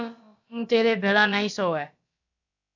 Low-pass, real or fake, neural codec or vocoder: 7.2 kHz; fake; codec, 16 kHz, about 1 kbps, DyCAST, with the encoder's durations